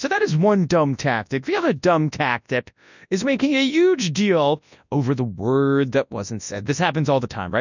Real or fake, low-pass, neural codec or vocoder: fake; 7.2 kHz; codec, 24 kHz, 0.9 kbps, WavTokenizer, large speech release